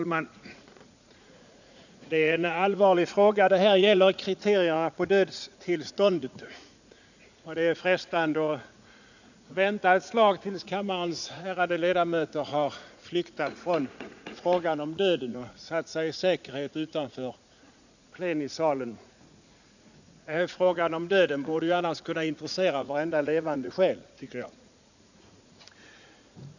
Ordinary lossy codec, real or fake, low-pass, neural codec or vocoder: none; fake; 7.2 kHz; vocoder, 44.1 kHz, 80 mel bands, Vocos